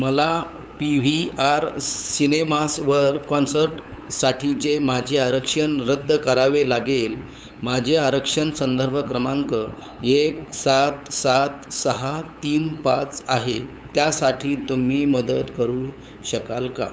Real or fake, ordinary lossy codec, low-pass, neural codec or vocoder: fake; none; none; codec, 16 kHz, 8 kbps, FunCodec, trained on LibriTTS, 25 frames a second